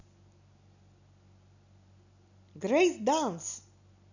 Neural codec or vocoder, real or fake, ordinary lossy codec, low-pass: none; real; none; 7.2 kHz